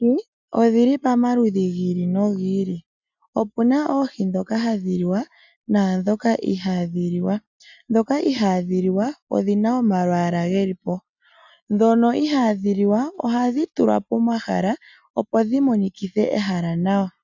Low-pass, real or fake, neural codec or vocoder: 7.2 kHz; real; none